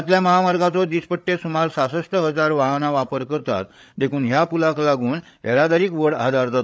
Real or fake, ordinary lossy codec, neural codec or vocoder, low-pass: fake; none; codec, 16 kHz, 8 kbps, FreqCodec, larger model; none